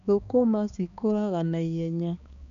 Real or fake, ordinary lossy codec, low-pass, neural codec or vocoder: fake; none; 7.2 kHz; codec, 16 kHz, 2 kbps, X-Codec, HuBERT features, trained on balanced general audio